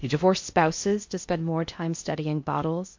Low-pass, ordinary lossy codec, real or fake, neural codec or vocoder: 7.2 kHz; MP3, 48 kbps; fake; codec, 16 kHz in and 24 kHz out, 0.6 kbps, FocalCodec, streaming, 4096 codes